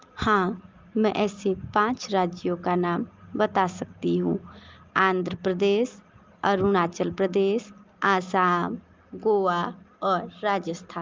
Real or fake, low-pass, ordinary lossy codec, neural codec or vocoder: real; 7.2 kHz; Opus, 32 kbps; none